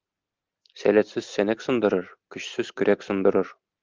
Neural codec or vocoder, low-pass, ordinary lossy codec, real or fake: none; 7.2 kHz; Opus, 32 kbps; real